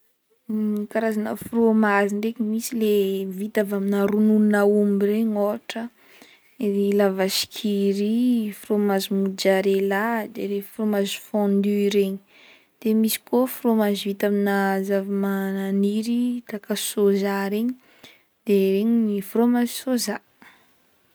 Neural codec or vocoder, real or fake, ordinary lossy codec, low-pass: none; real; none; none